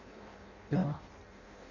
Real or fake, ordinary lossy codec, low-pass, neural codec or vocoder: fake; none; 7.2 kHz; codec, 16 kHz in and 24 kHz out, 0.6 kbps, FireRedTTS-2 codec